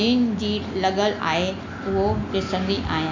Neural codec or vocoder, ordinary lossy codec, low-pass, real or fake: none; MP3, 64 kbps; 7.2 kHz; real